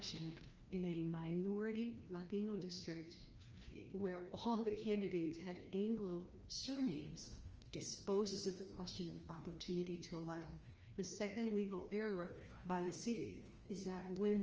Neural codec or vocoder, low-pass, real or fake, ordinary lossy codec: codec, 16 kHz, 1 kbps, FreqCodec, larger model; 7.2 kHz; fake; Opus, 24 kbps